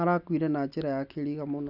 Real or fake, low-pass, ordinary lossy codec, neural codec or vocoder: real; 5.4 kHz; none; none